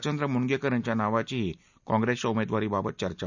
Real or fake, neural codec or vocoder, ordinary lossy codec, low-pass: real; none; none; 7.2 kHz